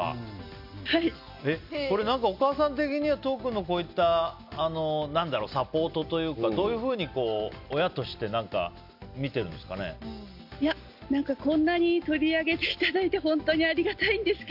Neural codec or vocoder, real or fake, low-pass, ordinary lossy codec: none; real; 5.4 kHz; none